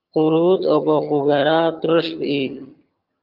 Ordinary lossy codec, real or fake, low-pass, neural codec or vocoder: Opus, 24 kbps; fake; 5.4 kHz; vocoder, 22.05 kHz, 80 mel bands, HiFi-GAN